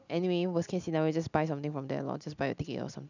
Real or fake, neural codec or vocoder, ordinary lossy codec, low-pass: real; none; none; 7.2 kHz